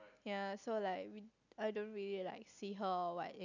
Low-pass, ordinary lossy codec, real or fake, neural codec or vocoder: 7.2 kHz; none; real; none